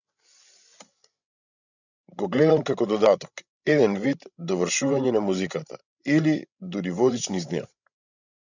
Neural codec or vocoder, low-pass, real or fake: codec, 16 kHz, 16 kbps, FreqCodec, larger model; 7.2 kHz; fake